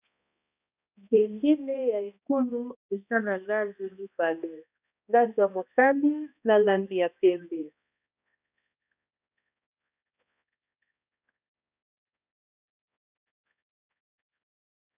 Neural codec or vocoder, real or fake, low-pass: codec, 16 kHz, 1 kbps, X-Codec, HuBERT features, trained on balanced general audio; fake; 3.6 kHz